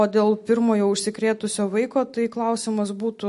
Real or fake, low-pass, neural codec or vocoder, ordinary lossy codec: real; 14.4 kHz; none; MP3, 48 kbps